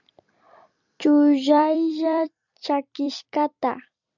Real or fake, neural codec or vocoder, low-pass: fake; vocoder, 44.1 kHz, 128 mel bands every 256 samples, BigVGAN v2; 7.2 kHz